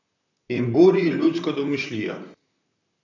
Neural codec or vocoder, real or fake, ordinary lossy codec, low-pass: vocoder, 44.1 kHz, 128 mel bands, Pupu-Vocoder; fake; none; 7.2 kHz